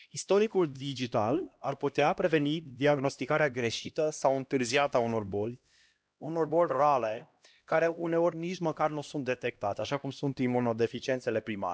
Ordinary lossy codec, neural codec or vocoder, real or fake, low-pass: none; codec, 16 kHz, 1 kbps, X-Codec, HuBERT features, trained on LibriSpeech; fake; none